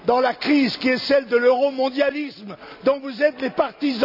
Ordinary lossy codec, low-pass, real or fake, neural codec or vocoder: none; 5.4 kHz; real; none